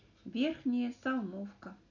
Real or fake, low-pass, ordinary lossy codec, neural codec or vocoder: real; 7.2 kHz; none; none